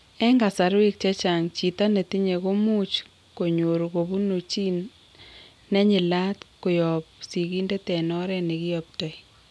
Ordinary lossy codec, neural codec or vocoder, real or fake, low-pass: none; none; real; none